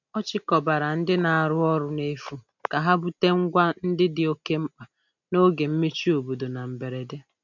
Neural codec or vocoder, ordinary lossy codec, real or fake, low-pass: none; none; real; 7.2 kHz